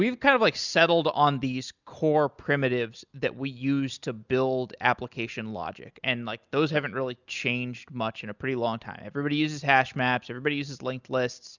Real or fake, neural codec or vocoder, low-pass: real; none; 7.2 kHz